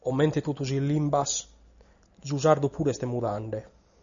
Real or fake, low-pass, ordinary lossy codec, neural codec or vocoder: real; 7.2 kHz; MP3, 96 kbps; none